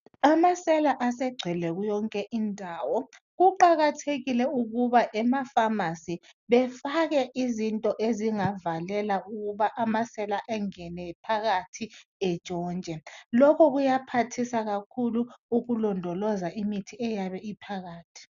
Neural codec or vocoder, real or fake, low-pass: none; real; 7.2 kHz